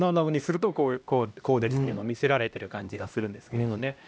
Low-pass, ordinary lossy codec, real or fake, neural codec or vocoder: none; none; fake; codec, 16 kHz, 1 kbps, X-Codec, HuBERT features, trained on LibriSpeech